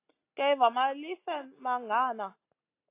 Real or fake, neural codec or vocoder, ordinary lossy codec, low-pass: real; none; AAC, 24 kbps; 3.6 kHz